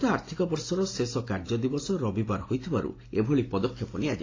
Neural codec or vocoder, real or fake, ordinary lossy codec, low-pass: none; real; AAC, 32 kbps; 7.2 kHz